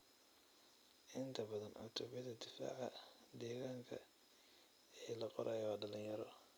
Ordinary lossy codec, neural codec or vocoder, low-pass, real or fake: none; vocoder, 44.1 kHz, 128 mel bands every 256 samples, BigVGAN v2; none; fake